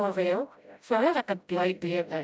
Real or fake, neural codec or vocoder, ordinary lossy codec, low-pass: fake; codec, 16 kHz, 0.5 kbps, FreqCodec, smaller model; none; none